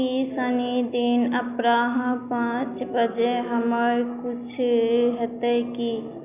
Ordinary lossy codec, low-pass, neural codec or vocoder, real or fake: none; 3.6 kHz; none; real